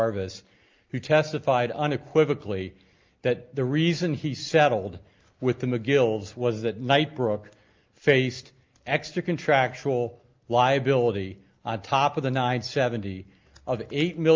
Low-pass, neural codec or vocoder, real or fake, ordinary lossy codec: 7.2 kHz; none; real; Opus, 24 kbps